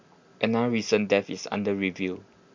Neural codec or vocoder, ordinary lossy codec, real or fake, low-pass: none; MP3, 64 kbps; real; 7.2 kHz